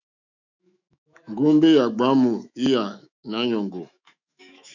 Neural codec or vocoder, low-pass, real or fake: autoencoder, 48 kHz, 128 numbers a frame, DAC-VAE, trained on Japanese speech; 7.2 kHz; fake